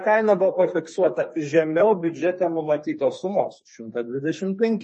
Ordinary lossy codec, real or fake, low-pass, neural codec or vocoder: MP3, 32 kbps; fake; 10.8 kHz; codec, 32 kHz, 1.9 kbps, SNAC